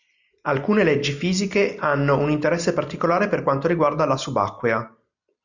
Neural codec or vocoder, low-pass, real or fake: none; 7.2 kHz; real